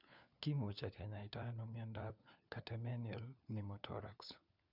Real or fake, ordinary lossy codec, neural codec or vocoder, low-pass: fake; none; codec, 16 kHz, 4 kbps, FunCodec, trained on LibriTTS, 50 frames a second; 5.4 kHz